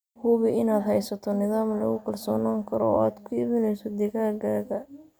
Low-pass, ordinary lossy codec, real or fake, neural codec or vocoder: none; none; real; none